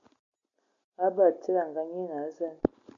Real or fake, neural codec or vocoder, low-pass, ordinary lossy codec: real; none; 7.2 kHz; MP3, 96 kbps